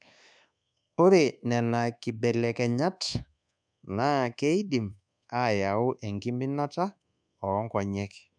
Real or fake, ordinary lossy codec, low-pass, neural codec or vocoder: fake; none; 9.9 kHz; codec, 24 kHz, 1.2 kbps, DualCodec